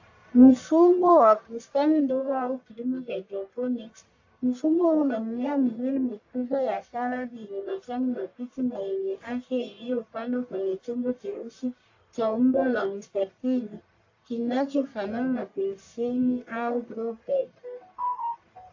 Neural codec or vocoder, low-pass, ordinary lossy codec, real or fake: codec, 44.1 kHz, 1.7 kbps, Pupu-Codec; 7.2 kHz; AAC, 48 kbps; fake